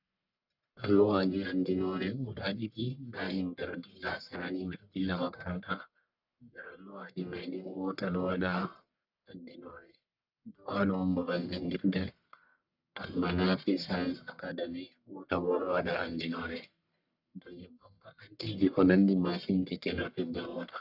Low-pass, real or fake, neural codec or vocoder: 5.4 kHz; fake; codec, 44.1 kHz, 1.7 kbps, Pupu-Codec